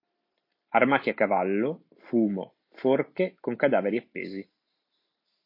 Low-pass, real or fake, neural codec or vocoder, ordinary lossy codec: 5.4 kHz; real; none; MP3, 32 kbps